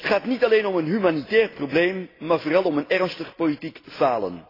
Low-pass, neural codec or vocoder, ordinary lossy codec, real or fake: 5.4 kHz; none; AAC, 24 kbps; real